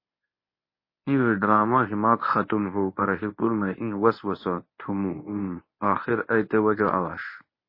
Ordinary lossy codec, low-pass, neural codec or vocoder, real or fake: MP3, 24 kbps; 5.4 kHz; codec, 24 kHz, 0.9 kbps, WavTokenizer, medium speech release version 1; fake